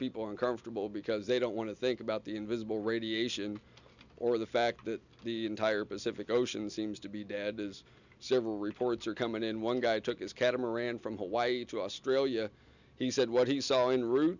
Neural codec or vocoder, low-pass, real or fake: none; 7.2 kHz; real